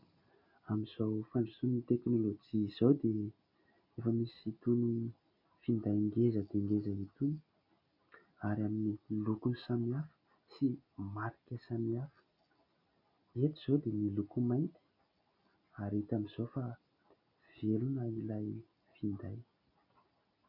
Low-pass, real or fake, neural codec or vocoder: 5.4 kHz; real; none